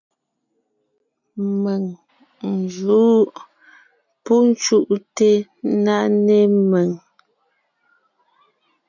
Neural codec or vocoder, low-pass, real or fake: none; 7.2 kHz; real